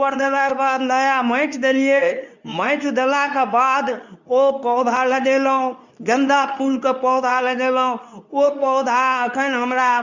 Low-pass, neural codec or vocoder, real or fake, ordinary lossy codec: 7.2 kHz; codec, 24 kHz, 0.9 kbps, WavTokenizer, medium speech release version 2; fake; none